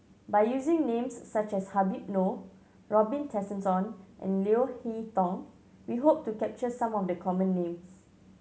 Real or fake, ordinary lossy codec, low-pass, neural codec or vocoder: real; none; none; none